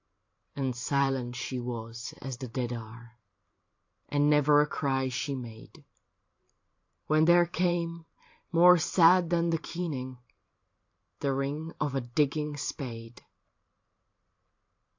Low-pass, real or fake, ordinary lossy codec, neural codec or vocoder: 7.2 kHz; fake; AAC, 48 kbps; vocoder, 44.1 kHz, 128 mel bands every 512 samples, BigVGAN v2